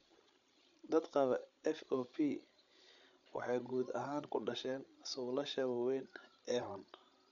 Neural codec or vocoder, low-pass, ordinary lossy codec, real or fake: codec, 16 kHz, 16 kbps, FreqCodec, larger model; 7.2 kHz; none; fake